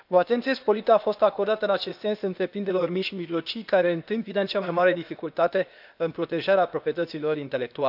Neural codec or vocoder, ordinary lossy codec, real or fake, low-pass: codec, 16 kHz, 0.8 kbps, ZipCodec; none; fake; 5.4 kHz